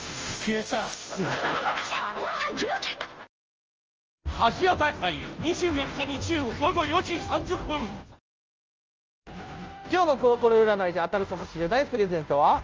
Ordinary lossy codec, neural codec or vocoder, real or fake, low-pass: Opus, 24 kbps; codec, 16 kHz, 0.5 kbps, FunCodec, trained on Chinese and English, 25 frames a second; fake; 7.2 kHz